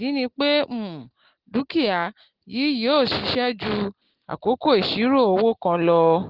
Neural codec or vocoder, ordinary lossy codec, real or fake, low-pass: none; Opus, 16 kbps; real; 5.4 kHz